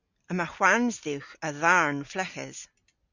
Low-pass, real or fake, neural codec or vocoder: 7.2 kHz; real; none